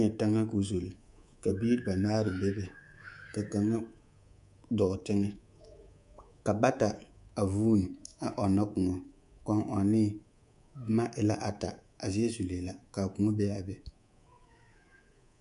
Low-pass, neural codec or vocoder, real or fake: 10.8 kHz; codec, 24 kHz, 3.1 kbps, DualCodec; fake